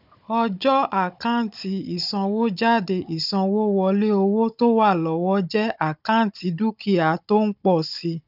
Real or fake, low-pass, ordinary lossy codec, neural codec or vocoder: fake; 5.4 kHz; none; codec, 16 kHz, 16 kbps, FunCodec, trained on Chinese and English, 50 frames a second